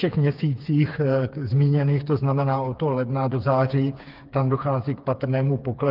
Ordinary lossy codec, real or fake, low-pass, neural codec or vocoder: Opus, 32 kbps; fake; 5.4 kHz; codec, 16 kHz, 4 kbps, FreqCodec, smaller model